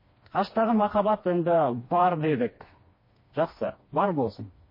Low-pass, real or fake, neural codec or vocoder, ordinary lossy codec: 5.4 kHz; fake; codec, 16 kHz, 2 kbps, FreqCodec, smaller model; MP3, 24 kbps